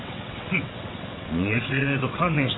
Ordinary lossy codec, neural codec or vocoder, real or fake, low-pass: AAC, 16 kbps; vocoder, 22.05 kHz, 80 mel bands, WaveNeXt; fake; 7.2 kHz